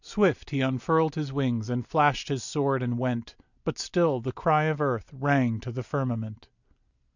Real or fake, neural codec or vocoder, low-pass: real; none; 7.2 kHz